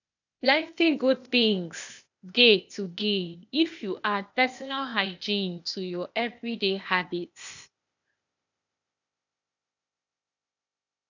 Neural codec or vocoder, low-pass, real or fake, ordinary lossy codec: codec, 16 kHz, 0.8 kbps, ZipCodec; 7.2 kHz; fake; none